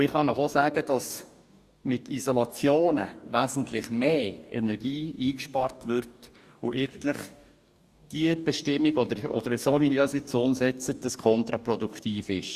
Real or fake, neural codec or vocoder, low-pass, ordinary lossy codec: fake; codec, 44.1 kHz, 2.6 kbps, DAC; 14.4 kHz; Opus, 64 kbps